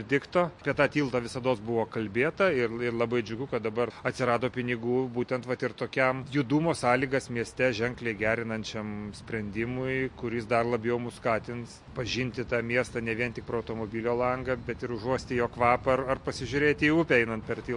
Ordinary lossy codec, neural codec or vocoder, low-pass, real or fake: MP3, 48 kbps; none; 10.8 kHz; real